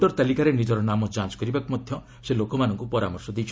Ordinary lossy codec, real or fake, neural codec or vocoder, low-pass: none; real; none; none